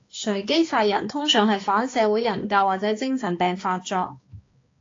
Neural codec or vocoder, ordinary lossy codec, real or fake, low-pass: codec, 16 kHz, 2 kbps, X-Codec, HuBERT features, trained on balanced general audio; AAC, 32 kbps; fake; 7.2 kHz